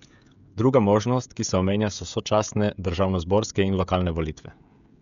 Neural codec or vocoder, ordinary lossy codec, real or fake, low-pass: codec, 16 kHz, 16 kbps, FreqCodec, smaller model; none; fake; 7.2 kHz